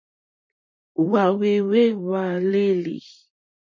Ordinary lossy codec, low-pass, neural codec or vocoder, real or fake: MP3, 32 kbps; 7.2 kHz; vocoder, 44.1 kHz, 128 mel bands, Pupu-Vocoder; fake